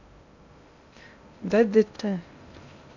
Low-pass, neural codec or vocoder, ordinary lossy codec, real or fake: 7.2 kHz; codec, 16 kHz in and 24 kHz out, 0.6 kbps, FocalCodec, streaming, 2048 codes; none; fake